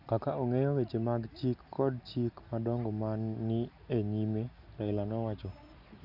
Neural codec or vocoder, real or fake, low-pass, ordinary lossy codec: none; real; 5.4 kHz; none